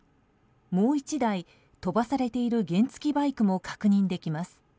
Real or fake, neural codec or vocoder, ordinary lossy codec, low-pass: real; none; none; none